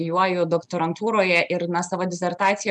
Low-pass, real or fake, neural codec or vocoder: 10.8 kHz; real; none